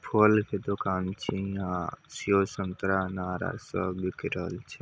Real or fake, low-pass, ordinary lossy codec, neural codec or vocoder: real; none; none; none